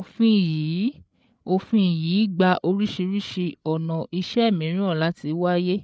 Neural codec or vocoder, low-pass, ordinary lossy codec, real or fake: codec, 16 kHz, 8 kbps, FreqCodec, larger model; none; none; fake